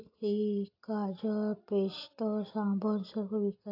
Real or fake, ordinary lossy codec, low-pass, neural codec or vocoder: real; AAC, 48 kbps; 5.4 kHz; none